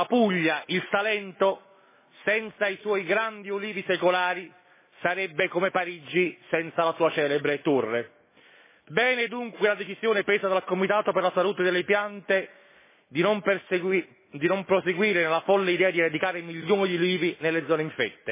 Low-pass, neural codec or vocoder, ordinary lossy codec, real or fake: 3.6 kHz; autoencoder, 48 kHz, 128 numbers a frame, DAC-VAE, trained on Japanese speech; MP3, 16 kbps; fake